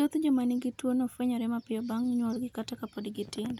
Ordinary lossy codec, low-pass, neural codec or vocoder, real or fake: none; 19.8 kHz; none; real